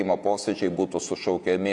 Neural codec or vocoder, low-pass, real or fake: vocoder, 48 kHz, 128 mel bands, Vocos; 10.8 kHz; fake